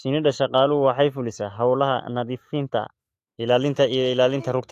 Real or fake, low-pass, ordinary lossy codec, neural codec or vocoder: fake; 14.4 kHz; AAC, 64 kbps; autoencoder, 48 kHz, 128 numbers a frame, DAC-VAE, trained on Japanese speech